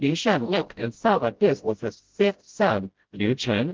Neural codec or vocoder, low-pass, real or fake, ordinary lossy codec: codec, 16 kHz, 0.5 kbps, FreqCodec, smaller model; 7.2 kHz; fake; Opus, 16 kbps